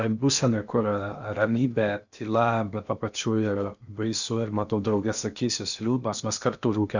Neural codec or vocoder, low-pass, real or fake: codec, 16 kHz in and 24 kHz out, 0.8 kbps, FocalCodec, streaming, 65536 codes; 7.2 kHz; fake